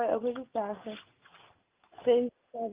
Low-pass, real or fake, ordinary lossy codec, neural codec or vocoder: 3.6 kHz; real; Opus, 24 kbps; none